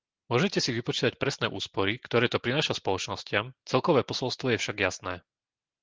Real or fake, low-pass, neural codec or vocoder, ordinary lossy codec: real; 7.2 kHz; none; Opus, 16 kbps